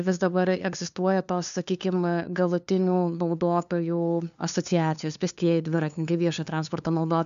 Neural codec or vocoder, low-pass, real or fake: codec, 16 kHz, 2 kbps, FunCodec, trained on LibriTTS, 25 frames a second; 7.2 kHz; fake